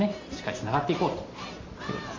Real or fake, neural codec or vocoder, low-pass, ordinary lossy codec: real; none; 7.2 kHz; MP3, 64 kbps